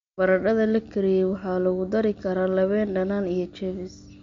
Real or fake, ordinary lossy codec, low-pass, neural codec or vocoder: real; MP3, 48 kbps; 7.2 kHz; none